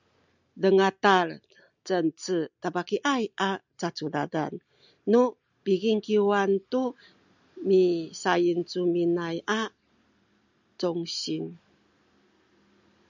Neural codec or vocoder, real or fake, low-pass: none; real; 7.2 kHz